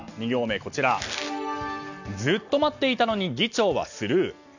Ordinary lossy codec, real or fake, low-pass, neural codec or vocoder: none; real; 7.2 kHz; none